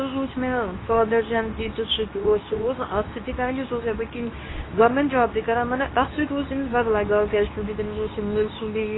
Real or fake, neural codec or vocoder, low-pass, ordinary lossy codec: fake; codec, 24 kHz, 0.9 kbps, WavTokenizer, medium speech release version 2; 7.2 kHz; AAC, 16 kbps